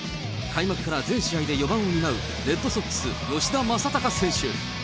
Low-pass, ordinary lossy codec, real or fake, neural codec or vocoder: none; none; real; none